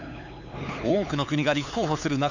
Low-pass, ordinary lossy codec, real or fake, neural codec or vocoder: 7.2 kHz; none; fake; codec, 16 kHz, 4 kbps, X-Codec, HuBERT features, trained on LibriSpeech